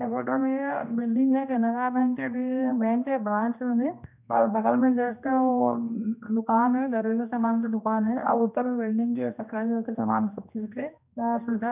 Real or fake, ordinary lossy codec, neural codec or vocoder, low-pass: fake; none; codec, 16 kHz, 1 kbps, X-Codec, HuBERT features, trained on general audio; 3.6 kHz